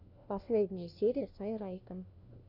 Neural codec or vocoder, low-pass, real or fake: codec, 16 kHz, 1 kbps, FunCodec, trained on LibriTTS, 50 frames a second; 5.4 kHz; fake